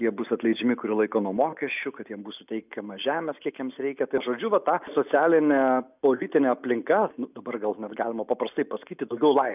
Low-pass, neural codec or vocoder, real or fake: 3.6 kHz; none; real